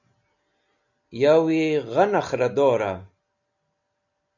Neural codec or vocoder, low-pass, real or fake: none; 7.2 kHz; real